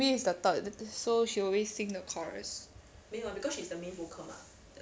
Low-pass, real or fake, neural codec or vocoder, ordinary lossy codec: none; real; none; none